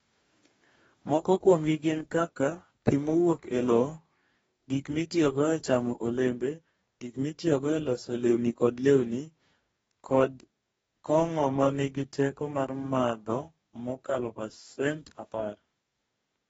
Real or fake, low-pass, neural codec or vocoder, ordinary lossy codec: fake; 19.8 kHz; codec, 44.1 kHz, 2.6 kbps, DAC; AAC, 24 kbps